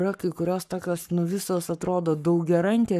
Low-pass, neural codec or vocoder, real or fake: 14.4 kHz; codec, 44.1 kHz, 7.8 kbps, Pupu-Codec; fake